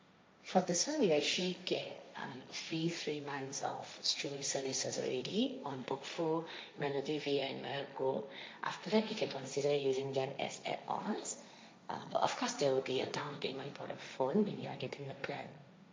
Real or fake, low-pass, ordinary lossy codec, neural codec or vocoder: fake; none; none; codec, 16 kHz, 1.1 kbps, Voila-Tokenizer